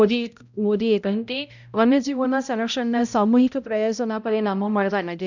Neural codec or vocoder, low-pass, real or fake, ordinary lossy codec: codec, 16 kHz, 0.5 kbps, X-Codec, HuBERT features, trained on balanced general audio; 7.2 kHz; fake; none